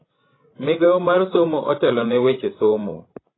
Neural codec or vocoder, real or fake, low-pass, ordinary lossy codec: codec, 16 kHz, 16 kbps, FreqCodec, larger model; fake; 7.2 kHz; AAC, 16 kbps